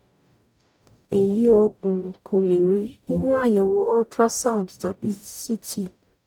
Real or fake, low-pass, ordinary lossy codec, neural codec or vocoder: fake; 19.8 kHz; none; codec, 44.1 kHz, 0.9 kbps, DAC